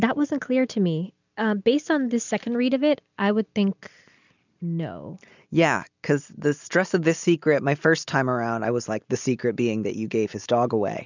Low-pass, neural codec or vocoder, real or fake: 7.2 kHz; none; real